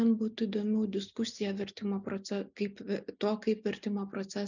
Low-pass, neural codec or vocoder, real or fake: 7.2 kHz; none; real